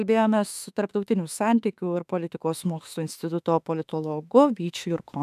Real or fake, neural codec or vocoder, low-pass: fake; autoencoder, 48 kHz, 32 numbers a frame, DAC-VAE, trained on Japanese speech; 14.4 kHz